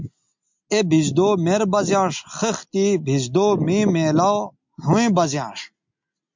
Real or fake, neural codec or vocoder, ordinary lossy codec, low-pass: real; none; MP3, 64 kbps; 7.2 kHz